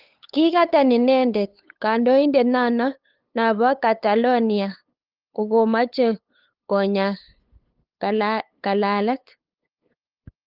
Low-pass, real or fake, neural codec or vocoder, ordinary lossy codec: 5.4 kHz; fake; codec, 16 kHz, 8 kbps, FunCodec, trained on LibriTTS, 25 frames a second; Opus, 32 kbps